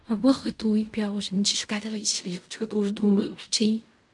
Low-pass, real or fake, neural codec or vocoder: 10.8 kHz; fake; codec, 16 kHz in and 24 kHz out, 0.4 kbps, LongCat-Audio-Codec, fine tuned four codebook decoder